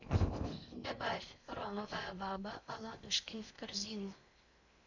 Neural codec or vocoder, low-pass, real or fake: codec, 16 kHz in and 24 kHz out, 0.6 kbps, FocalCodec, streaming, 4096 codes; 7.2 kHz; fake